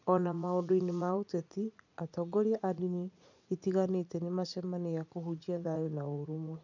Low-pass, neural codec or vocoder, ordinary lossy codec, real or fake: 7.2 kHz; vocoder, 44.1 kHz, 80 mel bands, Vocos; none; fake